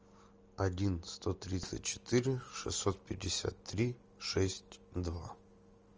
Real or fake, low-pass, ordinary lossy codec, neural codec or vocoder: real; 7.2 kHz; Opus, 24 kbps; none